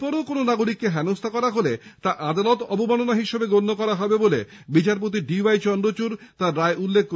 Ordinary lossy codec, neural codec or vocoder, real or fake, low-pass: none; none; real; none